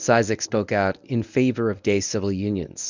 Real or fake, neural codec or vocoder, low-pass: fake; codec, 24 kHz, 0.9 kbps, WavTokenizer, medium speech release version 1; 7.2 kHz